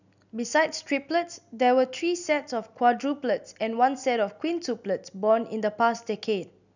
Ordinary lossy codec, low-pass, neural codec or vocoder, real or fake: none; 7.2 kHz; none; real